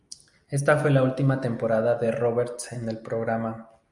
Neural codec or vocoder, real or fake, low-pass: none; real; 10.8 kHz